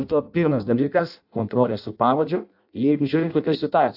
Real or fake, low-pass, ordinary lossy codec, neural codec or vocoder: fake; 5.4 kHz; AAC, 48 kbps; codec, 16 kHz in and 24 kHz out, 0.6 kbps, FireRedTTS-2 codec